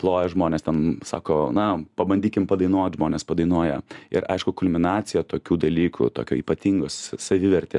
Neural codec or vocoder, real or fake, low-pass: none; real; 10.8 kHz